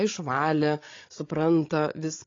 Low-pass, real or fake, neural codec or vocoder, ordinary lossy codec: 7.2 kHz; fake; codec, 16 kHz, 16 kbps, FreqCodec, larger model; AAC, 32 kbps